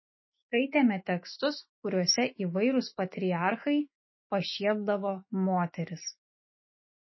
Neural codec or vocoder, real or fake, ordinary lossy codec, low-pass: autoencoder, 48 kHz, 128 numbers a frame, DAC-VAE, trained on Japanese speech; fake; MP3, 24 kbps; 7.2 kHz